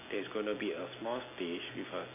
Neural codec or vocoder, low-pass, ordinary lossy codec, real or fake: none; 3.6 kHz; MP3, 24 kbps; real